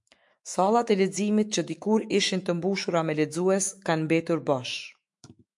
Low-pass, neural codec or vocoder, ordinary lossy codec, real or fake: 10.8 kHz; autoencoder, 48 kHz, 128 numbers a frame, DAC-VAE, trained on Japanese speech; MP3, 64 kbps; fake